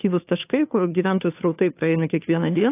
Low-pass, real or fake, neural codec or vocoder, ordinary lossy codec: 3.6 kHz; fake; codec, 16 kHz, 2 kbps, FunCodec, trained on Chinese and English, 25 frames a second; AAC, 24 kbps